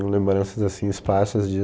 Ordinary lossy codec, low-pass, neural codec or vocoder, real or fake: none; none; none; real